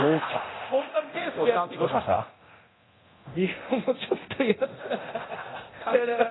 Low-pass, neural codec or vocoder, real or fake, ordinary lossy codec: 7.2 kHz; codec, 24 kHz, 0.9 kbps, DualCodec; fake; AAC, 16 kbps